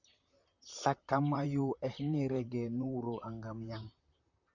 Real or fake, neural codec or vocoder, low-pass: fake; vocoder, 44.1 kHz, 128 mel bands, Pupu-Vocoder; 7.2 kHz